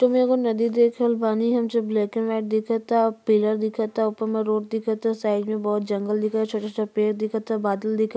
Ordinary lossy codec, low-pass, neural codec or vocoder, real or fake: none; none; none; real